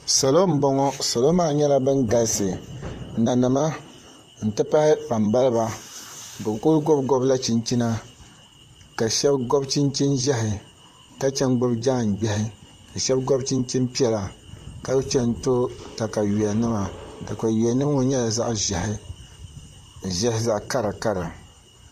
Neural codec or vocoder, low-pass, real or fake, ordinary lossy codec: vocoder, 44.1 kHz, 128 mel bands, Pupu-Vocoder; 14.4 kHz; fake; MP3, 64 kbps